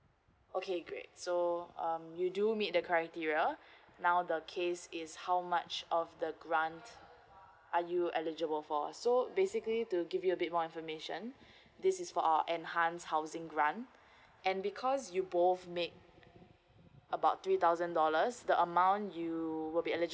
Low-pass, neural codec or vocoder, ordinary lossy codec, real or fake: none; none; none; real